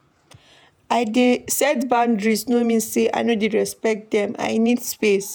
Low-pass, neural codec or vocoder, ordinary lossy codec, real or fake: none; vocoder, 48 kHz, 128 mel bands, Vocos; none; fake